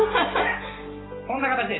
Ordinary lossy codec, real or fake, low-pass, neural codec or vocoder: AAC, 16 kbps; real; 7.2 kHz; none